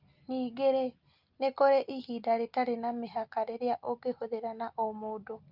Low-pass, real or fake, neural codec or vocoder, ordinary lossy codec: 5.4 kHz; real; none; Opus, 24 kbps